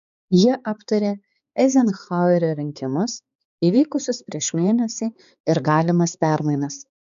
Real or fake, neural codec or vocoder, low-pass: fake; codec, 16 kHz, 4 kbps, X-Codec, HuBERT features, trained on balanced general audio; 7.2 kHz